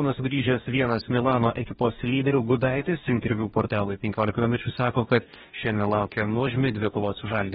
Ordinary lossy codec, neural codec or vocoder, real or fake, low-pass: AAC, 16 kbps; codec, 44.1 kHz, 2.6 kbps, DAC; fake; 19.8 kHz